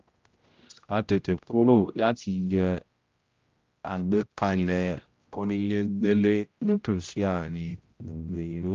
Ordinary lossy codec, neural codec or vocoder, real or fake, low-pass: Opus, 32 kbps; codec, 16 kHz, 0.5 kbps, X-Codec, HuBERT features, trained on general audio; fake; 7.2 kHz